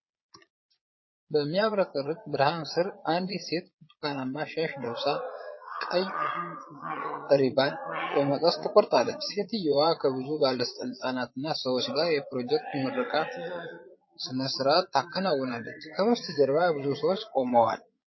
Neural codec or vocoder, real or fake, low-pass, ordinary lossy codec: vocoder, 22.05 kHz, 80 mel bands, Vocos; fake; 7.2 kHz; MP3, 24 kbps